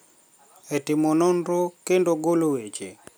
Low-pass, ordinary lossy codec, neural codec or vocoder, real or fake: none; none; none; real